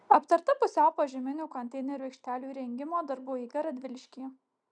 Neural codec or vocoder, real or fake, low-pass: none; real; 9.9 kHz